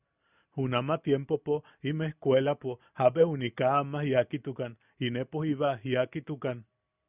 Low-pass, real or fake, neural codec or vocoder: 3.6 kHz; real; none